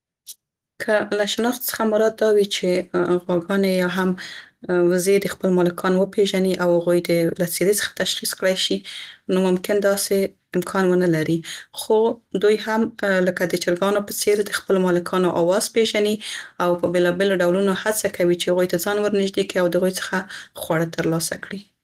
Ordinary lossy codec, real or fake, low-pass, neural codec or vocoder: Opus, 24 kbps; real; 14.4 kHz; none